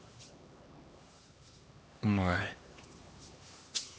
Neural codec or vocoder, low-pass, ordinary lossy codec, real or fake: codec, 16 kHz, 2 kbps, X-Codec, HuBERT features, trained on LibriSpeech; none; none; fake